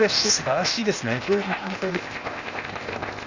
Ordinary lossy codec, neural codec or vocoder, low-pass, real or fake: Opus, 64 kbps; codec, 16 kHz, 0.8 kbps, ZipCodec; 7.2 kHz; fake